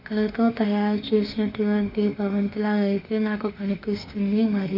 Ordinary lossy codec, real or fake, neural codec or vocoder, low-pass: none; fake; autoencoder, 48 kHz, 32 numbers a frame, DAC-VAE, trained on Japanese speech; 5.4 kHz